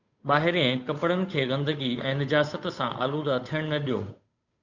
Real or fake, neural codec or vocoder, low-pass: fake; codec, 16 kHz, 8 kbps, FunCodec, trained on Chinese and English, 25 frames a second; 7.2 kHz